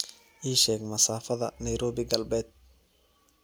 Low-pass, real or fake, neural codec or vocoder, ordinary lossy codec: none; real; none; none